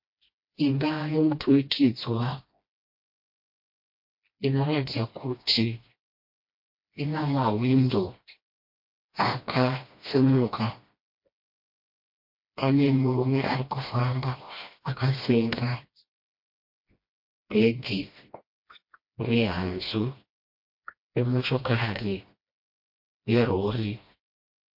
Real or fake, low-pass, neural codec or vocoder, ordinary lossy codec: fake; 5.4 kHz; codec, 16 kHz, 1 kbps, FreqCodec, smaller model; MP3, 32 kbps